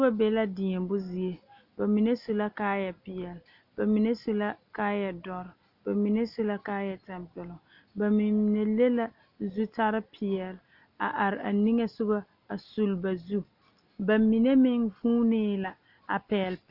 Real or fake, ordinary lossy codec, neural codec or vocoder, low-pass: real; Opus, 64 kbps; none; 5.4 kHz